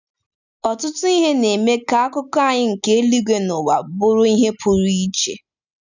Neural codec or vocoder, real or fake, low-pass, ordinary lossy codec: none; real; 7.2 kHz; none